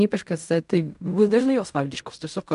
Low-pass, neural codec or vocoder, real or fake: 10.8 kHz; codec, 16 kHz in and 24 kHz out, 0.4 kbps, LongCat-Audio-Codec, fine tuned four codebook decoder; fake